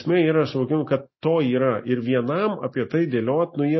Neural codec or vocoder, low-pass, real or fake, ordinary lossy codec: none; 7.2 kHz; real; MP3, 24 kbps